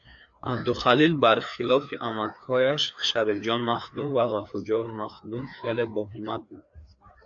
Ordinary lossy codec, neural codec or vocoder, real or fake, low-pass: AAC, 64 kbps; codec, 16 kHz, 2 kbps, FreqCodec, larger model; fake; 7.2 kHz